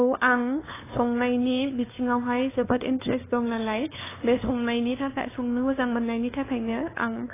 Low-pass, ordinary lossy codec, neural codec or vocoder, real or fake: 3.6 kHz; AAC, 16 kbps; codec, 16 kHz, 2 kbps, FunCodec, trained on LibriTTS, 25 frames a second; fake